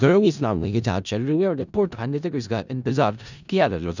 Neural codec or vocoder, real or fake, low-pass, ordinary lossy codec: codec, 16 kHz in and 24 kHz out, 0.4 kbps, LongCat-Audio-Codec, four codebook decoder; fake; 7.2 kHz; none